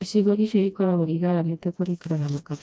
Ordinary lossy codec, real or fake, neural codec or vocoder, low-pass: none; fake; codec, 16 kHz, 1 kbps, FreqCodec, smaller model; none